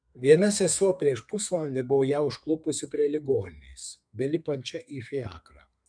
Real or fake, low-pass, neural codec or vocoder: fake; 9.9 kHz; codec, 32 kHz, 1.9 kbps, SNAC